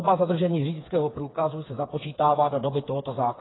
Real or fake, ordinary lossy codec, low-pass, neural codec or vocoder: fake; AAC, 16 kbps; 7.2 kHz; codec, 16 kHz, 4 kbps, FreqCodec, smaller model